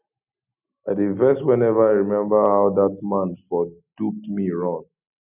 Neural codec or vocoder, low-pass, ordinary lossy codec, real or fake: none; 3.6 kHz; none; real